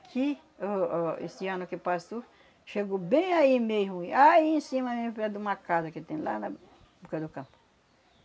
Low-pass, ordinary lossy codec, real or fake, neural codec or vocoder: none; none; real; none